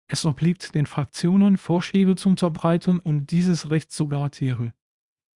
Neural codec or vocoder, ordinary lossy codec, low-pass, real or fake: codec, 24 kHz, 0.9 kbps, WavTokenizer, small release; Opus, 64 kbps; 10.8 kHz; fake